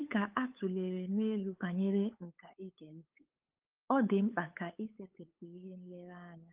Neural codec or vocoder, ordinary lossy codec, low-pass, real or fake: codec, 16 kHz, 8 kbps, FunCodec, trained on LibriTTS, 25 frames a second; Opus, 32 kbps; 3.6 kHz; fake